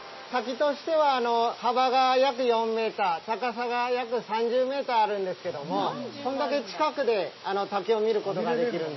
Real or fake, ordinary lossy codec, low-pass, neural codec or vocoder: real; MP3, 24 kbps; 7.2 kHz; none